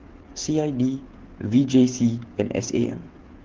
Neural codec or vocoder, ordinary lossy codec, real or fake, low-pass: codec, 16 kHz, 8 kbps, FreqCodec, smaller model; Opus, 16 kbps; fake; 7.2 kHz